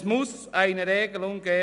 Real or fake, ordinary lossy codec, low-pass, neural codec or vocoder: real; none; 10.8 kHz; none